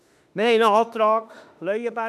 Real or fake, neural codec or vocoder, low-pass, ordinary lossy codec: fake; autoencoder, 48 kHz, 32 numbers a frame, DAC-VAE, trained on Japanese speech; 14.4 kHz; none